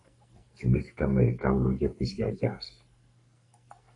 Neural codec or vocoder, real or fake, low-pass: codec, 44.1 kHz, 2.6 kbps, SNAC; fake; 10.8 kHz